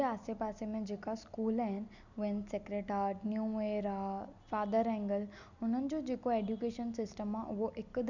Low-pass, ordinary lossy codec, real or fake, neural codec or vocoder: 7.2 kHz; none; real; none